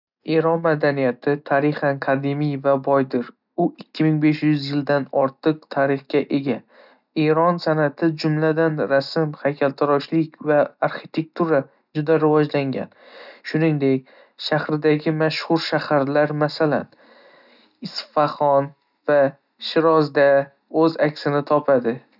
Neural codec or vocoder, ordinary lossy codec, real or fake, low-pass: none; none; real; 5.4 kHz